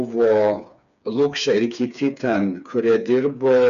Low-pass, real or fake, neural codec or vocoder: 7.2 kHz; fake; codec, 16 kHz, 4 kbps, FreqCodec, smaller model